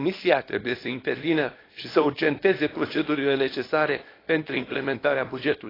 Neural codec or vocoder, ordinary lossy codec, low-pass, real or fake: codec, 24 kHz, 0.9 kbps, WavTokenizer, small release; AAC, 24 kbps; 5.4 kHz; fake